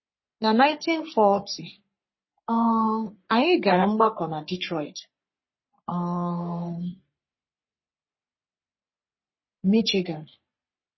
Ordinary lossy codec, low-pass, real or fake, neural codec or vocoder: MP3, 24 kbps; 7.2 kHz; fake; codec, 44.1 kHz, 3.4 kbps, Pupu-Codec